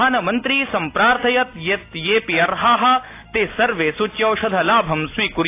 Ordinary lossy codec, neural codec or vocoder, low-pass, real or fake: AAC, 24 kbps; none; 3.6 kHz; real